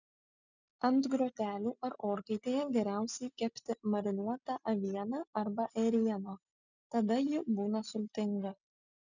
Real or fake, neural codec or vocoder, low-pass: real; none; 7.2 kHz